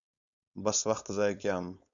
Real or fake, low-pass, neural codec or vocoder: fake; 7.2 kHz; codec, 16 kHz, 4.8 kbps, FACodec